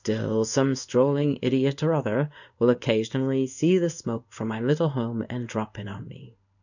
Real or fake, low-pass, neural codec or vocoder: fake; 7.2 kHz; codec, 16 kHz in and 24 kHz out, 1 kbps, XY-Tokenizer